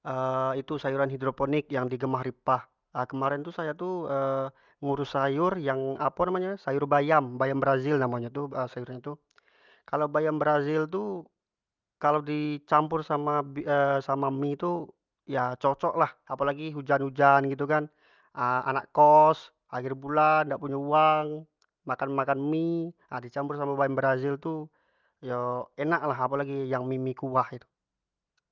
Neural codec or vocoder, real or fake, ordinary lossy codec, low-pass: none; real; Opus, 24 kbps; 7.2 kHz